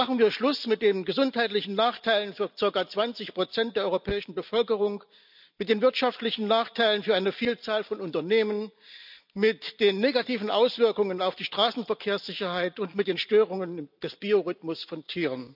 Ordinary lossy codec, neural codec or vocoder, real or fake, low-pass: none; none; real; 5.4 kHz